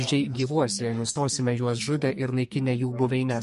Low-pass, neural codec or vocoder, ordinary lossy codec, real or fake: 14.4 kHz; codec, 44.1 kHz, 2.6 kbps, SNAC; MP3, 48 kbps; fake